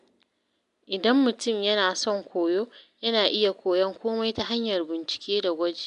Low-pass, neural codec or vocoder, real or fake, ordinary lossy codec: 10.8 kHz; none; real; MP3, 96 kbps